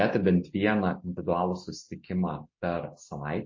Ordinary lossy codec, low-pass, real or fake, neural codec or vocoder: MP3, 32 kbps; 7.2 kHz; real; none